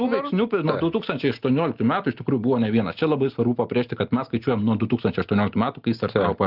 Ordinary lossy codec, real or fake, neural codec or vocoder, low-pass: Opus, 32 kbps; real; none; 5.4 kHz